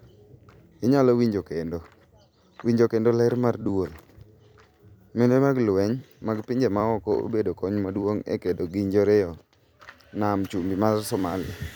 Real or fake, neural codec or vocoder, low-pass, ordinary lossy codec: fake; vocoder, 44.1 kHz, 128 mel bands every 256 samples, BigVGAN v2; none; none